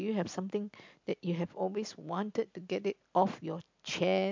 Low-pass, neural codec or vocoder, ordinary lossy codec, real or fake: 7.2 kHz; none; MP3, 64 kbps; real